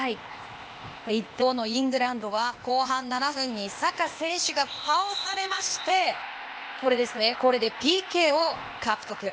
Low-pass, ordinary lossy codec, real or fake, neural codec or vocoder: none; none; fake; codec, 16 kHz, 0.8 kbps, ZipCodec